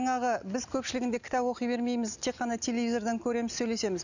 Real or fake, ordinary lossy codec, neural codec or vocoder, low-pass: real; none; none; 7.2 kHz